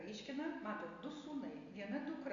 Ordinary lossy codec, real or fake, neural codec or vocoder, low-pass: Opus, 64 kbps; real; none; 7.2 kHz